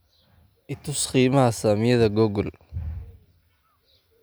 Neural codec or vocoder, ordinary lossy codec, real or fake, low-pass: none; none; real; none